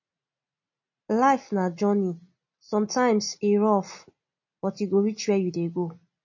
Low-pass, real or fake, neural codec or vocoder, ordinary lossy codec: 7.2 kHz; real; none; MP3, 32 kbps